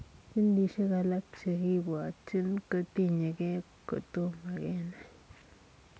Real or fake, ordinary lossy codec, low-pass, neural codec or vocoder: real; none; none; none